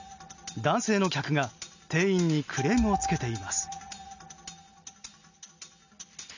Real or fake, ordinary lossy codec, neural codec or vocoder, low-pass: real; none; none; 7.2 kHz